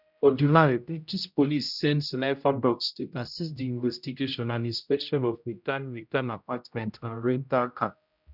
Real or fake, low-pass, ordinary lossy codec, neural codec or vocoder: fake; 5.4 kHz; none; codec, 16 kHz, 0.5 kbps, X-Codec, HuBERT features, trained on general audio